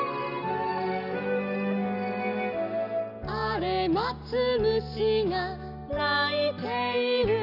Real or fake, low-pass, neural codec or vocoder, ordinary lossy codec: real; 5.4 kHz; none; none